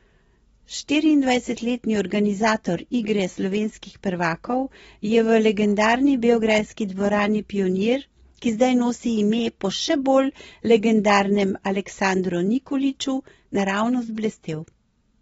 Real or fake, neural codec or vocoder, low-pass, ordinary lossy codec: real; none; 19.8 kHz; AAC, 24 kbps